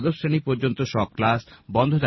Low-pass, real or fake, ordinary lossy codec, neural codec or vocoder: 7.2 kHz; real; MP3, 24 kbps; none